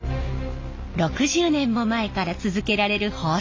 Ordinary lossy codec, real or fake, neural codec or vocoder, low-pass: AAC, 32 kbps; real; none; 7.2 kHz